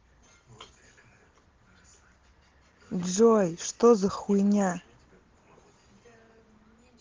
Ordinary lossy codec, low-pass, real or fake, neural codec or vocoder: Opus, 16 kbps; 7.2 kHz; real; none